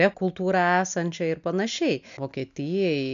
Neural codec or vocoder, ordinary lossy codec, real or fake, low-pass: none; MP3, 64 kbps; real; 7.2 kHz